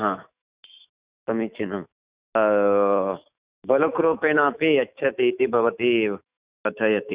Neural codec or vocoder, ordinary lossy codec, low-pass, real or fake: autoencoder, 48 kHz, 128 numbers a frame, DAC-VAE, trained on Japanese speech; Opus, 64 kbps; 3.6 kHz; fake